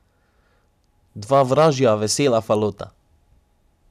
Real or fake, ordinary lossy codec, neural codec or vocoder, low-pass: real; none; none; 14.4 kHz